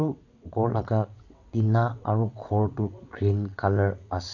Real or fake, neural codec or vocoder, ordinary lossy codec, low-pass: fake; vocoder, 22.05 kHz, 80 mel bands, WaveNeXt; AAC, 48 kbps; 7.2 kHz